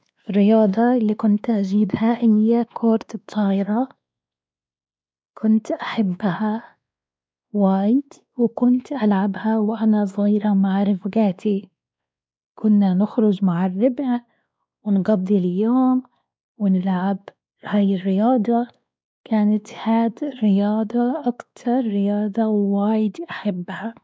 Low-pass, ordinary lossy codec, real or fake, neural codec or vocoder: none; none; fake; codec, 16 kHz, 2 kbps, X-Codec, WavLM features, trained on Multilingual LibriSpeech